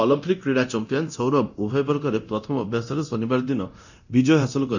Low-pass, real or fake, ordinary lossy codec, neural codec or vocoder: 7.2 kHz; fake; none; codec, 24 kHz, 0.9 kbps, DualCodec